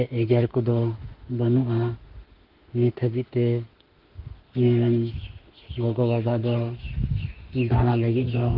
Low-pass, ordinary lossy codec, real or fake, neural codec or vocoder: 5.4 kHz; Opus, 16 kbps; fake; autoencoder, 48 kHz, 32 numbers a frame, DAC-VAE, trained on Japanese speech